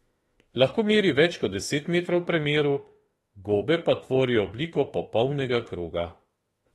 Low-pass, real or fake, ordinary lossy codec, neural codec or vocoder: 19.8 kHz; fake; AAC, 32 kbps; autoencoder, 48 kHz, 32 numbers a frame, DAC-VAE, trained on Japanese speech